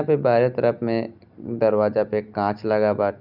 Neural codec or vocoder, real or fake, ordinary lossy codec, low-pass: none; real; none; 5.4 kHz